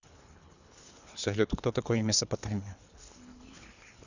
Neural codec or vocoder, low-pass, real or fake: codec, 24 kHz, 3 kbps, HILCodec; 7.2 kHz; fake